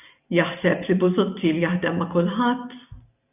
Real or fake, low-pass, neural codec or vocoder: real; 3.6 kHz; none